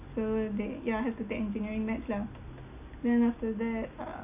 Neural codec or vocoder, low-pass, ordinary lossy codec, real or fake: none; 3.6 kHz; AAC, 32 kbps; real